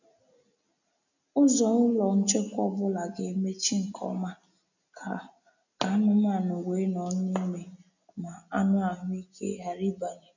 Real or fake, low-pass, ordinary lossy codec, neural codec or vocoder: real; 7.2 kHz; none; none